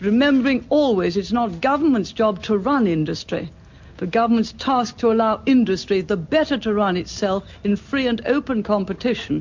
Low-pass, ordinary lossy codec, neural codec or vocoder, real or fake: 7.2 kHz; MP3, 48 kbps; none; real